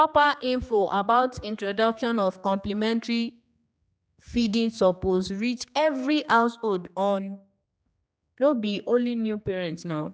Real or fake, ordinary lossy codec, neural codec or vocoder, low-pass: fake; none; codec, 16 kHz, 2 kbps, X-Codec, HuBERT features, trained on general audio; none